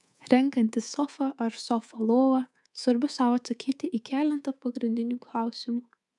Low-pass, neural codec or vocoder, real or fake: 10.8 kHz; codec, 24 kHz, 3.1 kbps, DualCodec; fake